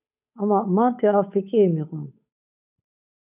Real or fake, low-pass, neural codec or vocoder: fake; 3.6 kHz; codec, 16 kHz, 8 kbps, FunCodec, trained on Chinese and English, 25 frames a second